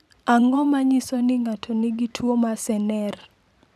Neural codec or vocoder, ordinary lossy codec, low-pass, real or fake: vocoder, 44.1 kHz, 128 mel bands every 512 samples, BigVGAN v2; none; 14.4 kHz; fake